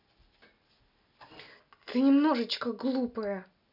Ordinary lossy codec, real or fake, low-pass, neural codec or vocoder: none; real; 5.4 kHz; none